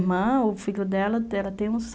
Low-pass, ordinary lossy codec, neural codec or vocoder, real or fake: none; none; none; real